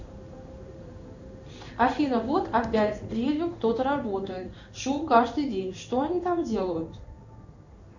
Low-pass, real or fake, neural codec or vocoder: 7.2 kHz; fake; codec, 16 kHz in and 24 kHz out, 1 kbps, XY-Tokenizer